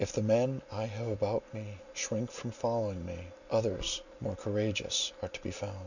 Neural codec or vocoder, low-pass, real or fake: none; 7.2 kHz; real